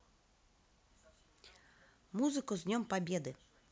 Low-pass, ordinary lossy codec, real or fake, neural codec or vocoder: none; none; real; none